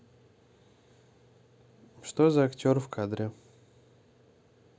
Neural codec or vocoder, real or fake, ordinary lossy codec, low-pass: none; real; none; none